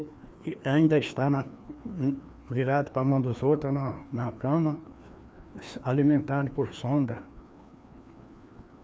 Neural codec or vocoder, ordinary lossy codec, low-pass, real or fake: codec, 16 kHz, 2 kbps, FreqCodec, larger model; none; none; fake